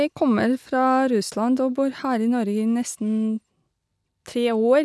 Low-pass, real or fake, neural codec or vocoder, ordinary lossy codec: none; real; none; none